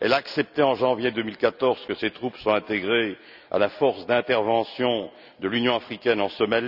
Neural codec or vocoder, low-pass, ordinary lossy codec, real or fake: none; 5.4 kHz; none; real